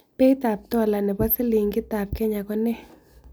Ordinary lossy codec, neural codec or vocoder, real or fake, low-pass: none; none; real; none